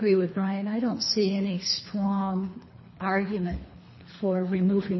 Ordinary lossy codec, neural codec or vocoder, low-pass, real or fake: MP3, 24 kbps; codec, 24 kHz, 3 kbps, HILCodec; 7.2 kHz; fake